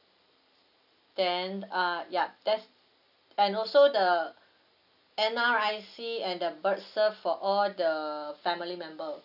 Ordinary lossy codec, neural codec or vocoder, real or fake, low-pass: none; none; real; 5.4 kHz